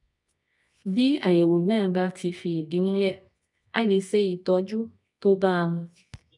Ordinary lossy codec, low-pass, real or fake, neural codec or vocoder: none; 10.8 kHz; fake; codec, 24 kHz, 0.9 kbps, WavTokenizer, medium music audio release